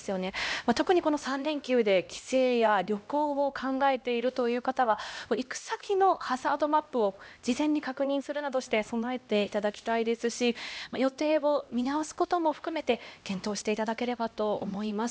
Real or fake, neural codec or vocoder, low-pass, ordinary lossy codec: fake; codec, 16 kHz, 1 kbps, X-Codec, HuBERT features, trained on LibriSpeech; none; none